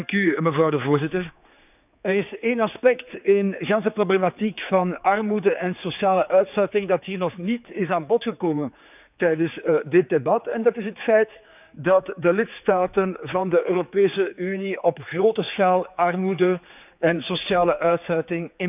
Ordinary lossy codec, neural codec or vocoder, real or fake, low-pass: none; codec, 16 kHz, 4 kbps, X-Codec, HuBERT features, trained on general audio; fake; 3.6 kHz